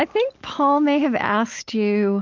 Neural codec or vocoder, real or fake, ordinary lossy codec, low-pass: none; real; Opus, 24 kbps; 7.2 kHz